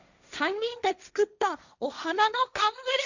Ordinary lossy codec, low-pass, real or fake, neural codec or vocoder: none; 7.2 kHz; fake; codec, 16 kHz, 1.1 kbps, Voila-Tokenizer